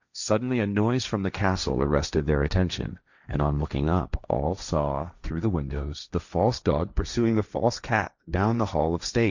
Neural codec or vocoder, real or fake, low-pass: codec, 16 kHz, 1.1 kbps, Voila-Tokenizer; fake; 7.2 kHz